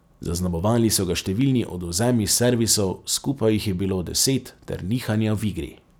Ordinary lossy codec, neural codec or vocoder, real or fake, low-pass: none; none; real; none